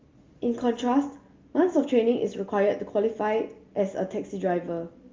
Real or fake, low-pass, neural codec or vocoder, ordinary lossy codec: real; 7.2 kHz; none; Opus, 32 kbps